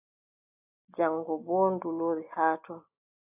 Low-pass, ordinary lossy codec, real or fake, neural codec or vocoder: 3.6 kHz; AAC, 24 kbps; real; none